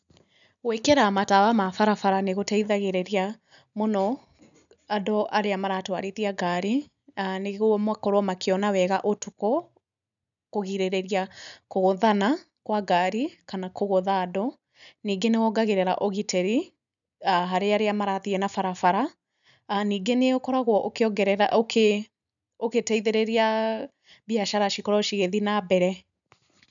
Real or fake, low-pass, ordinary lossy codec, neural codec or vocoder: real; 7.2 kHz; none; none